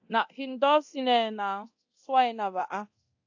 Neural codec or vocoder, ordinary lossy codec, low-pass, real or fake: codec, 24 kHz, 0.9 kbps, DualCodec; AAC, 48 kbps; 7.2 kHz; fake